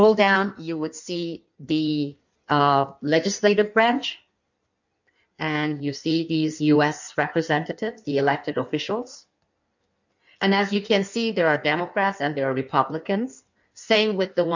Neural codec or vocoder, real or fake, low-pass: codec, 16 kHz in and 24 kHz out, 1.1 kbps, FireRedTTS-2 codec; fake; 7.2 kHz